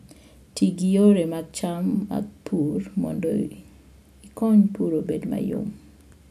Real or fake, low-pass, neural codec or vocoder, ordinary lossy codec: real; 14.4 kHz; none; none